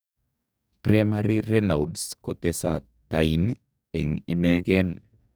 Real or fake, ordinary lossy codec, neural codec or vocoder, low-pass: fake; none; codec, 44.1 kHz, 2.6 kbps, DAC; none